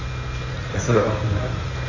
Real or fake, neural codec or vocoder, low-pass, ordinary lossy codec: fake; codec, 16 kHz in and 24 kHz out, 2.2 kbps, FireRedTTS-2 codec; 7.2 kHz; AAC, 32 kbps